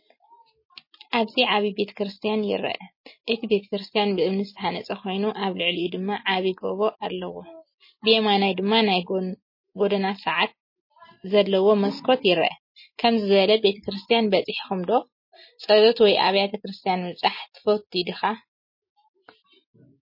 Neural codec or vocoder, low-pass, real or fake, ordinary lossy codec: none; 5.4 kHz; real; MP3, 24 kbps